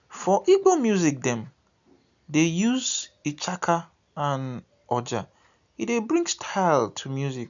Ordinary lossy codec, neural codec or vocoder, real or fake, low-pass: none; none; real; 7.2 kHz